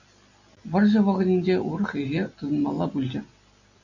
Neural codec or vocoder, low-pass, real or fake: none; 7.2 kHz; real